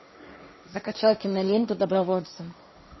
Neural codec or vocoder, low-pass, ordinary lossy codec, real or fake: codec, 16 kHz, 1.1 kbps, Voila-Tokenizer; 7.2 kHz; MP3, 24 kbps; fake